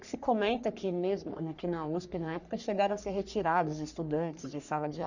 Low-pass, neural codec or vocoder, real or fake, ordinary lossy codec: 7.2 kHz; codec, 44.1 kHz, 3.4 kbps, Pupu-Codec; fake; none